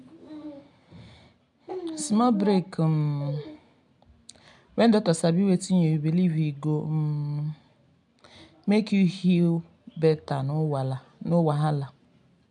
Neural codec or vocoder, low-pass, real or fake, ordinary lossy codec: none; 10.8 kHz; real; MP3, 96 kbps